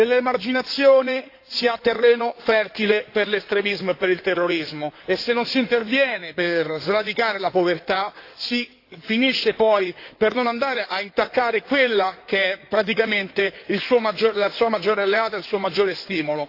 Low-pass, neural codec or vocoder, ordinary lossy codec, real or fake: 5.4 kHz; codec, 16 kHz in and 24 kHz out, 2.2 kbps, FireRedTTS-2 codec; AAC, 32 kbps; fake